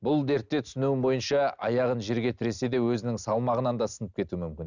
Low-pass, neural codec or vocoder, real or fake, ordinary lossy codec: 7.2 kHz; none; real; none